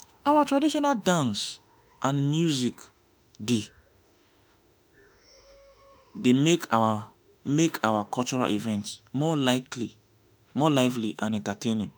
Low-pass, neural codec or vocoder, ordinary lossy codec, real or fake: none; autoencoder, 48 kHz, 32 numbers a frame, DAC-VAE, trained on Japanese speech; none; fake